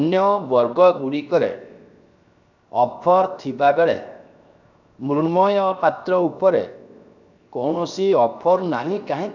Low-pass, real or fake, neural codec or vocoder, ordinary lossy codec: 7.2 kHz; fake; codec, 16 kHz, 0.7 kbps, FocalCodec; none